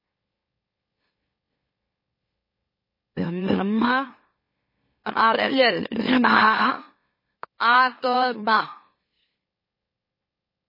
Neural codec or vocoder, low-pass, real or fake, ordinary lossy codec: autoencoder, 44.1 kHz, a latent of 192 numbers a frame, MeloTTS; 5.4 kHz; fake; MP3, 24 kbps